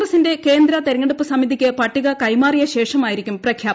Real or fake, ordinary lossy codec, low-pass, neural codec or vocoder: real; none; none; none